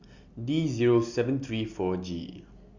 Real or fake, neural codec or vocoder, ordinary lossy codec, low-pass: real; none; Opus, 64 kbps; 7.2 kHz